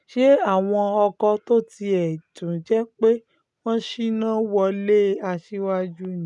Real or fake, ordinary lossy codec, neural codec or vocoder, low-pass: real; none; none; 10.8 kHz